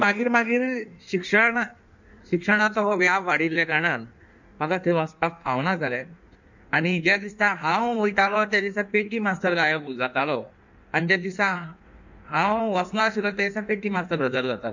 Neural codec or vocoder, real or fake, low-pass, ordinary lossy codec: codec, 16 kHz in and 24 kHz out, 1.1 kbps, FireRedTTS-2 codec; fake; 7.2 kHz; none